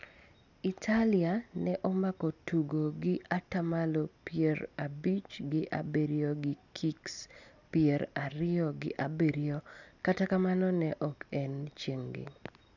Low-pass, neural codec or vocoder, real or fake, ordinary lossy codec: 7.2 kHz; none; real; none